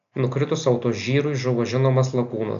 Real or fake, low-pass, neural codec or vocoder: real; 7.2 kHz; none